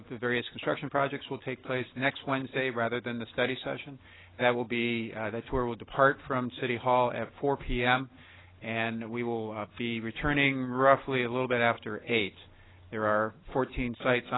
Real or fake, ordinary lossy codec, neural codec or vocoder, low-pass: real; AAC, 16 kbps; none; 7.2 kHz